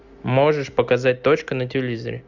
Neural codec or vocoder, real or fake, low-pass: none; real; 7.2 kHz